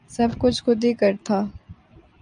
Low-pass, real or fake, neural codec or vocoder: 9.9 kHz; real; none